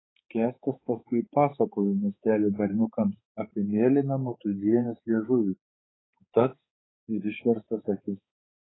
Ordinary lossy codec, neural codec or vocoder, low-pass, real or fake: AAC, 16 kbps; codec, 16 kHz, 4 kbps, X-Codec, HuBERT features, trained on balanced general audio; 7.2 kHz; fake